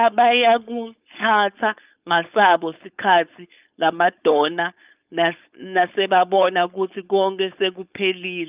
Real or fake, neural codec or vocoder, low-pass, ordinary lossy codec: fake; codec, 16 kHz, 4.8 kbps, FACodec; 3.6 kHz; Opus, 24 kbps